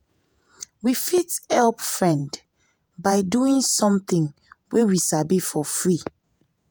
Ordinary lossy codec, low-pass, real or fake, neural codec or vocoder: none; none; fake; vocoder, 48 kHz, 128 mel bands, Vocos